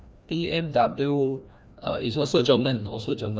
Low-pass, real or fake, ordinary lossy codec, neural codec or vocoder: none; fake; none; codec, 16 kHz, 1 kbps, FreqCodec, larger model